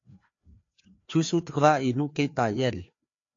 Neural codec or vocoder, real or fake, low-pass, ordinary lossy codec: codec, 16 kHz, 2 kbps, FreqCodec, larger model; fake; 7.2 kHz; AAC, 48 kbps